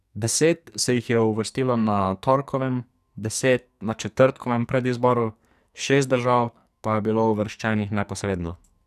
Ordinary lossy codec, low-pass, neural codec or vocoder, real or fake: none; 14.4 kHz; codec, 44.1 kHz, 2.6 kbps, SNAC; fake